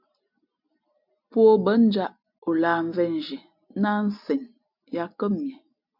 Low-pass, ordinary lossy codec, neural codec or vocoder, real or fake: 5.4 kHz; MP3, 48 kbps; none; real